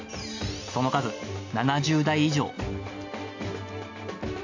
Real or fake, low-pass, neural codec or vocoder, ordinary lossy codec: real; 7.2 kHz; none; AAC, 48 kbps